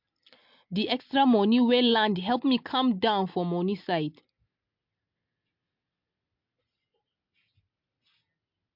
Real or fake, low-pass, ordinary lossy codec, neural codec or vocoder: real; 5.4 kHz; MP3, 48 kbps; none